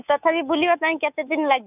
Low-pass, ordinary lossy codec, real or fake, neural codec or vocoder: 3.6 kHz; none; fake; codec, 24 kHz, 3.1 kbps, DualCodec